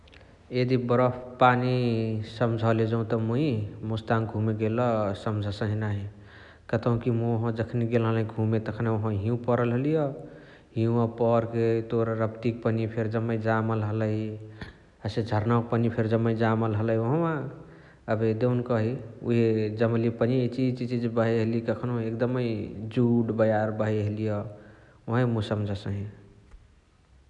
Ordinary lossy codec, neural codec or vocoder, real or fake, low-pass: none; none; real; 10.8 kHz